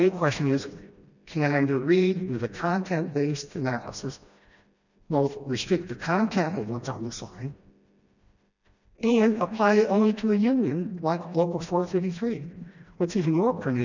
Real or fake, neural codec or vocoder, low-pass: fake; codec, 16 kHz, 1 kbps, FreqCodec, smaller model; 7.2 kHz